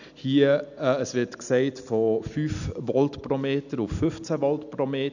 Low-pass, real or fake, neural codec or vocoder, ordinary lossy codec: 7.2 kHz; real; none; none